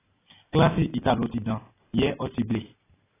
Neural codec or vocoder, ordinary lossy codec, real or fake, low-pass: none; AAC, 16 kbps; real; 3.6 kHz